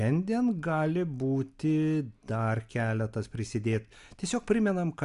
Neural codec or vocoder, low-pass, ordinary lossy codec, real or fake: none; 10.8 kHz; MP3, 96 kbps; real